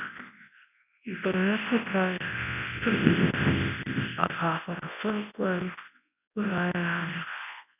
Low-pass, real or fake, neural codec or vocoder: 3.6 kHz; fake; codec, 24 kHz, 0.9 kbps, WavTokenizer, large speech release